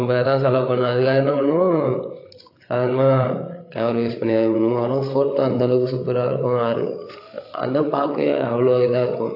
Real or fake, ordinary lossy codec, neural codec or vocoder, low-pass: fake; AAC, 32 kbps; codec, 16 kHz, 8 kbps, FreqCodec, larger model; 5.4 kHz